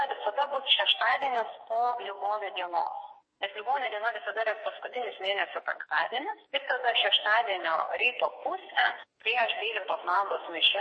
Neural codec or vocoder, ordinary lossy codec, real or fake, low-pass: codec, 44.1 kHz, 2.6 kbps, SNAC; MP3, 32 kbps; fake; 9.9 kHz